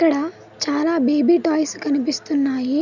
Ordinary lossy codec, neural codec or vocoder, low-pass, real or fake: none; none; 7.2 kHz; real